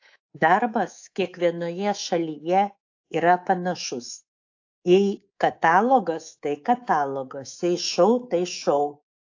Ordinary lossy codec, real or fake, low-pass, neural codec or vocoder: AAC, 48 kbps; fake; 7.2 kHz; codec, 24 kHz, 3.1 kbps, DualCodec